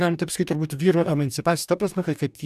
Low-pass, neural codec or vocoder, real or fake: 14.4 kHz; codec, 44.1 kHz, 2.6 kbps, DAC; fake